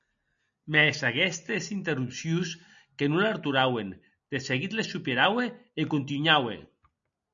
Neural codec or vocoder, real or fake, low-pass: none; real; 7.2 kHz